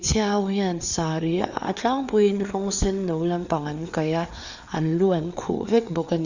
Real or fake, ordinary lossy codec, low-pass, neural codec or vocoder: fake; Opus, 64 kbps; 7.2 kHz; codec, 16 kHz, 4 kbps, FreqCodec, larger model